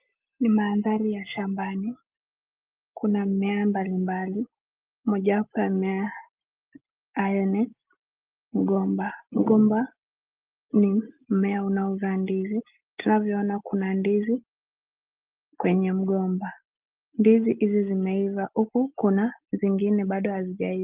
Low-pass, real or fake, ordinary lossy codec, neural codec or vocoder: 3.6 kHz; real; Opus, 32 kbps; none